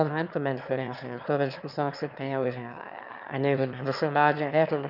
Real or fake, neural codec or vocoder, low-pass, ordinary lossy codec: fake; autoencoder, 22.05 kHz, a latent of 192 numbers a frame, VITS, trained on one speaker; 5.4 kHz; none